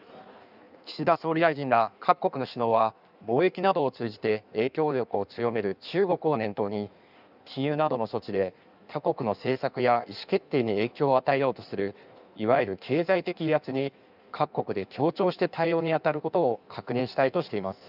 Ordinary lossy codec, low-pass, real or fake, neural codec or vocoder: none; 5.4 kHz; fake; codec, 16 kHz in and 24 kHz out, 1.1 kbps, FireRedTTS-2 codec